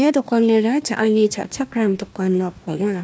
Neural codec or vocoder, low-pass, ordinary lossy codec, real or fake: codec, 16 kHz, 1 kbps, FunCodec, trained on Chinese and English, 50 frames a second; none; none; fake